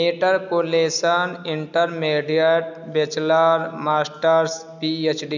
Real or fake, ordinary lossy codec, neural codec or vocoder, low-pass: real; none; none; 7.2 kHz